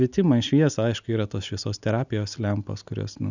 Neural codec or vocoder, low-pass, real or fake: none; 7.2 kHz; real